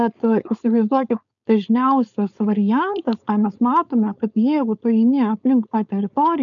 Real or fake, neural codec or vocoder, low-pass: fake; codec, 16 kHz, 4.8 kbps, FACodec; 7.2 kHz